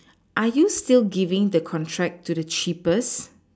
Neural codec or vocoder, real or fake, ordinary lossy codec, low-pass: none; real; none; none